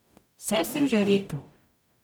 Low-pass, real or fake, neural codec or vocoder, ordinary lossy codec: none; fake; codec, 44.1 kHz, 0.9 kbps, DAC; none